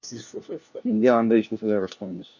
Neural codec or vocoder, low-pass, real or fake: codec, 16 kHz, 1 kbps, FunCodec, trained on LibriTTS, 50 frames a second; 7.2 kHz; fake